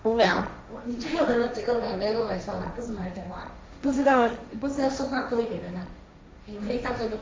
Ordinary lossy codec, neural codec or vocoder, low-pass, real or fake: none; codec, 16 kHz, 1.1 kbps, Voila-Tokenizer; none; fake